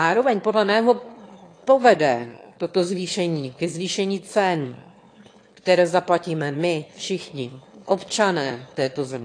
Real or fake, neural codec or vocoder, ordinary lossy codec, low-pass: fake; autoencoder, 22.05 kHz, a latent of 192 numbers a frame, VITS, trained on one speaker; AAC, 48 kbps; 9.9 kHz